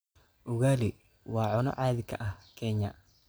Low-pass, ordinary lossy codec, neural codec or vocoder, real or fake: none; none; none; real